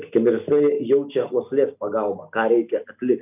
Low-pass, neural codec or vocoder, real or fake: 3.6 kHz; none; real